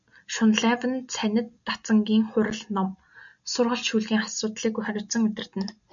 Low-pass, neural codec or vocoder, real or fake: 7.2 kHz; none; real